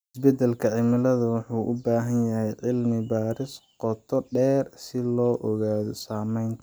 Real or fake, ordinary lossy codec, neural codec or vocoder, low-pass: real; none; none; none